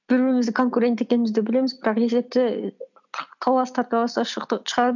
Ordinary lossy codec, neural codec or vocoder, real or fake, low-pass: none; none; real; 7.2 kHz